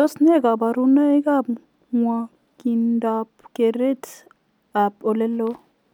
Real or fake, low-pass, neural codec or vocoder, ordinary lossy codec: real; 19.8 kHz; none; none